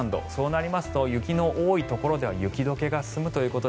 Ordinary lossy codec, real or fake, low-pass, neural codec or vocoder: none; real; none; none